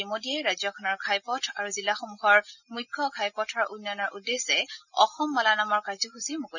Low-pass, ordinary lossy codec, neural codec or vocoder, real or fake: 7.2 kHz; none; none; real